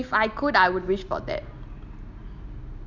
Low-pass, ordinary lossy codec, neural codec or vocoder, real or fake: 7.2 kHz; none; none; real